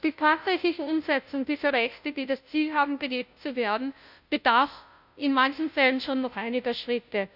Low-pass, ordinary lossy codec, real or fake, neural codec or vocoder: 5.4 kHz; none; fake; codec, 16 kHz, 0.5 kbps, FunCodec, trained on Chinese and English, 25 frames a second